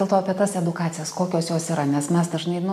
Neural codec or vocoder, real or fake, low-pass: none; real; 14.4 kHz